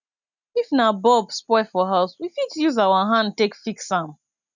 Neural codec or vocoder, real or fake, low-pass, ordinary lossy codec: none; real; 7.2 kHz; none